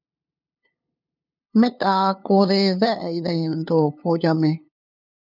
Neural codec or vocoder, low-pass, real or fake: codec, 16 kHz, 2 kbps, FunCodec, trained on LibriTTS, 25 frames a second; 5.4 kHz; fake